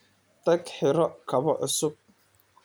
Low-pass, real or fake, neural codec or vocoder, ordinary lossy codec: none; fake; vocoder, 44.1 kHz, 128 mel bands every 256 samples, BigVGAN v2; none